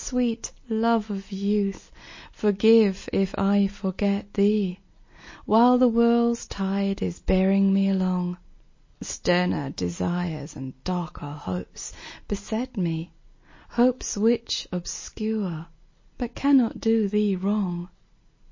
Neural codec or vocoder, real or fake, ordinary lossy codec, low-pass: none; real; MP3, 32 kbps; 7.2 kHz